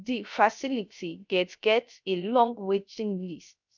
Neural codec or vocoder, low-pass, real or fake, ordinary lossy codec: codec, 16 kHz, 0.3 kbps, FocalCodec; 7.2 kHz; fake; none